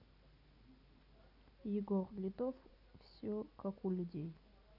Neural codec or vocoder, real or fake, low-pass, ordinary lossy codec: none; real; 5.4 kHz; none